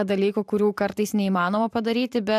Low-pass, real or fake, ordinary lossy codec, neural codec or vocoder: 14.4 kHz; fake; AAC, 96 kbps; vocoder, 44.1 kHz, 128 mel bands every 512 samples, BigVGAN v2